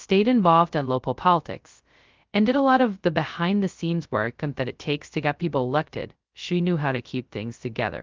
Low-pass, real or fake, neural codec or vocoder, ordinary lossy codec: 7.2 kHz; fake; codec, 16 kHz, 0.2 kbps, FocalCodec; Opus, 32 kbps